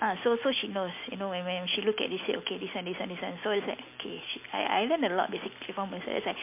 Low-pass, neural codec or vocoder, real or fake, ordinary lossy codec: 3.6 kHz; none; real; MP3, 24 kbps